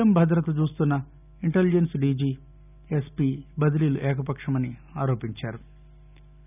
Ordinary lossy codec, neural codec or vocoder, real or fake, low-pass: none; none; real; 3.6 kHz